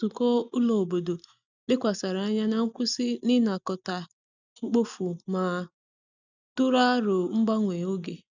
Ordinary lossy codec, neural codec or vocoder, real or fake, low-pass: none; none; real; 7.2 kHz